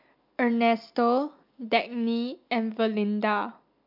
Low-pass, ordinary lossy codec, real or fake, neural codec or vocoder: 5.4 kHz; MP3, 48 kbps; real; none